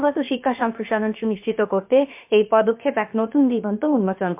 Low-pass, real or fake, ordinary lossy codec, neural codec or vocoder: 3.6 kHz; fake; MP3, 32 kbps; codec, 16 kHz, about 1 kbps, DyCAST, with the encoder's durations